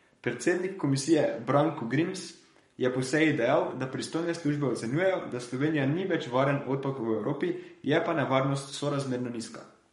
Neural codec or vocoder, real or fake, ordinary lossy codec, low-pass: codec, 44.1 kHz, 7.8 kbps, Pupu-Codec; fake; MP3, 48 kbps; 19.8 kHz